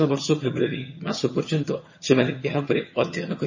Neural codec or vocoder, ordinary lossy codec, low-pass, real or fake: vocoder, 22.05 kHz, 80 mel bands, HiFi-GAN; MP3, 32 kbps; 7.2 kHz; fake